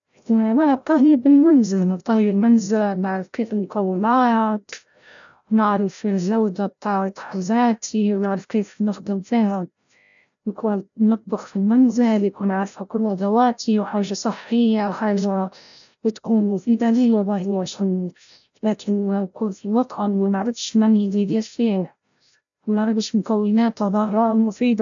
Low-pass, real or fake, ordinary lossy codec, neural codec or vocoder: 7.2 kHz; fake; none; codec, 16 kHz, 0.5 kbps, FreqCodec, larger model